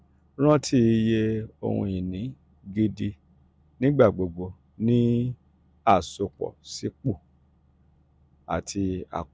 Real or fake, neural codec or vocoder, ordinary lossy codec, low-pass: real; none; none; none